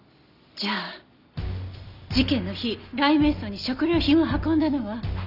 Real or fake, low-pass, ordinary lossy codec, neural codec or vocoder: real; 5.4 kHz; none; none